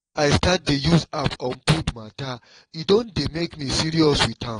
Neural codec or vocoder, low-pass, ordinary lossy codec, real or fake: vocoder, 22.05 kHz, 80 mel bands, Vocos; 9.9 kHz; AAC, 32 kbps; fake